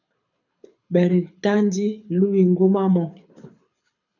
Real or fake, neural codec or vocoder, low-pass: fake; codec, 24 kHz, 6 kbps, HILCodec; 7.2 kHz